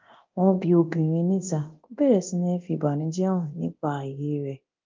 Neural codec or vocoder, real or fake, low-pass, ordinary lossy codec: codec, 24 kHz, 0.9 kbps, DualCodec; fake; 7.2 kHz; Opus, 32 kbps